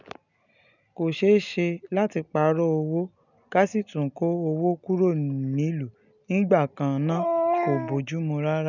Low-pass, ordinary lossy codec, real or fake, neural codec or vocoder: 7.2 kHz; none; real; none